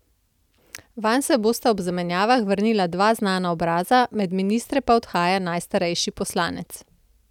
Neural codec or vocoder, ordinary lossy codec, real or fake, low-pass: none; none; real; 19.8 kHz